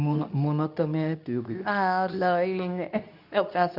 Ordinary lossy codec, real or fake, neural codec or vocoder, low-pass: none; fake; codec, 24 kHz, 0.9 kbps, WavTokenizer, medium speech release version 2; 5.4 kHz